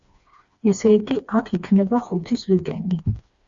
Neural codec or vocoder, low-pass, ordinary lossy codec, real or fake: codec, 16 kHz, 2 kbps, FreqCodec, smaller model; 7.2 kHz; Opus, 64 kbps; fake